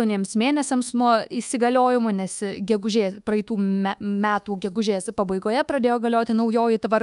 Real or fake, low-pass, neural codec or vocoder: fake; 10.8 kHz; codec, 24 kHz, 1.2 kbps, DualCodec